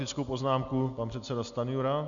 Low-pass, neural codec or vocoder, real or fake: 7.2 kHz; none; real